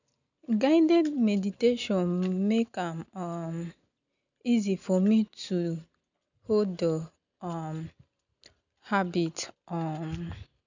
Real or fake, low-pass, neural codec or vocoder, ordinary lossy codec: real; 7.2 kHz; none; none